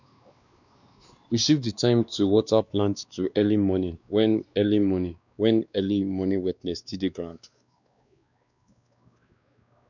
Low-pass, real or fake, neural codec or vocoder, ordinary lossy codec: 7.2 kHz; fake; codec, 16 kHz, 2 kbps, X-Codec, WavLM features, trained on Multilingual LibriSpeech; none